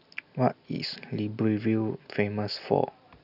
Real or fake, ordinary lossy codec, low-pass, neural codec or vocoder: real; none; 5.4 kHz; none